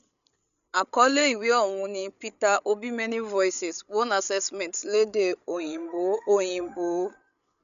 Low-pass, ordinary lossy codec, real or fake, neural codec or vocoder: 7.2 kHz; none; fake; codec, 16 kHz, 8 kbps, FreqCodec, larger model